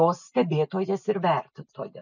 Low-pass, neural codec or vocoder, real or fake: 7.2 kHz; none; real